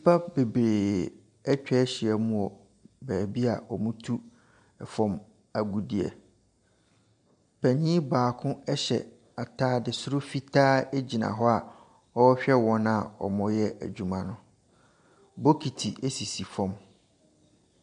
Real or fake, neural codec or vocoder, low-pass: real; none; 9.9 kHz